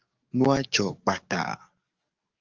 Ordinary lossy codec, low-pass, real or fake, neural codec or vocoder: Opus, 24 kbps; 7.2 kHz; fake; codec, 44.1 kHz, 7.8 kbps, DAC